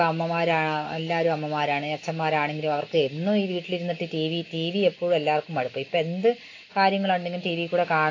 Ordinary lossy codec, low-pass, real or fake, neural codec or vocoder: AAC, 32 kbps; 7.2 kHz; real; none